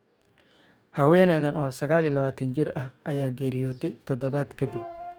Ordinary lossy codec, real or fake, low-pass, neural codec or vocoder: none; fake; none; codec, 44.1 kHz, 2.6 kbps, DAC